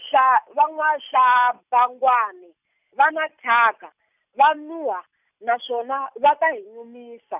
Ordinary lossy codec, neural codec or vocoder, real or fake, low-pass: none; none; real; 3.6 kHz